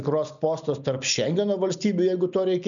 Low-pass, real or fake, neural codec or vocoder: 7.2 kHz; real; none